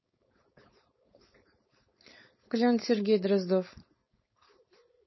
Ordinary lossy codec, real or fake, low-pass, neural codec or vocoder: MP3, 24 kbps; fake; 7.2 kHz; codec, 16 kHz, 4.8 kbps, FACodec